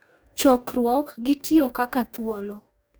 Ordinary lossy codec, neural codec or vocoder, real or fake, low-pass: none; codec, 44.1 kHz, 2.6 kbps, DAC; fake; none